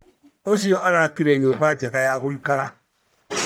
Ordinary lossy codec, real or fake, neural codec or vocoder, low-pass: none; fake; codec, 44.1 kHz, 1.7 kbps, Pupu-Codec; none